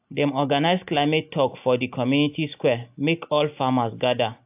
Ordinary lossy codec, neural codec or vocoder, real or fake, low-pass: AAC, 32 kbps; none; real; 3.6 kHz